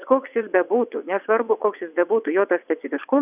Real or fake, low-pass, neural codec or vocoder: fake; 3.6 kHz; vocoder, 22.05 kHz, 80 mel bands, WaveNeXt